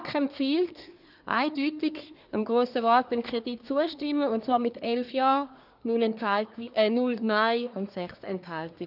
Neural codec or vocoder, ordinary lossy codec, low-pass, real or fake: codec, 24 kHz, 1 kbps, SNAC; none; 5.4 kHz; fake